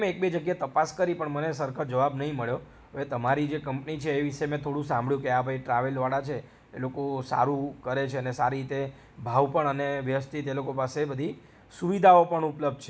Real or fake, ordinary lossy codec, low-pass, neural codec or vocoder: real; none; none; none